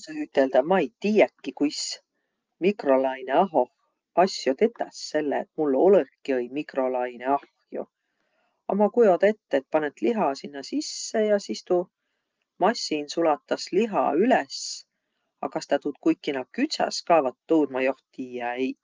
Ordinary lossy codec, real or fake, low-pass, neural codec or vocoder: Opus, 24 kbps; real; 7.2 kHz; none